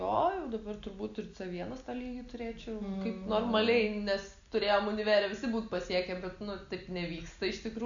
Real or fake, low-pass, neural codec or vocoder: real; 7.2 kHz; none